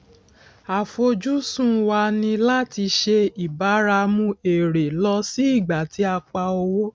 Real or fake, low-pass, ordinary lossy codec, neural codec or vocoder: real; none; none; none